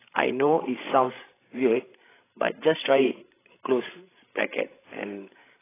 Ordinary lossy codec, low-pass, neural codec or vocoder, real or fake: AAC, 16 kbps; 3.6 kHz; codec, 16 kHz, 16 kbps, FreqCodec, larger model; fake